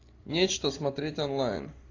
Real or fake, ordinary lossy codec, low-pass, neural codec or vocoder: real; AAC, 32 kbps; 7.2 kHz; none